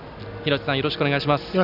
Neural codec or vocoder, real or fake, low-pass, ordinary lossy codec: none; real; 5.4 kHz; none